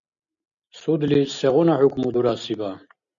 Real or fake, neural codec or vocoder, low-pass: real; none; 7.2 kHz